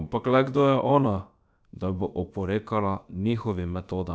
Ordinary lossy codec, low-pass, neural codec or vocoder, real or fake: none; none; codec, 16 kHz, about 1 kbps, DyCAST, with the encoder's durations; fake